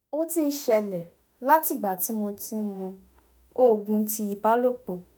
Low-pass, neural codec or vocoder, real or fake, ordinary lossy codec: none; autoencoder, 48 kHz, 32 numbers a frame, DAC-VAE, trained on Japanese speech; fake; none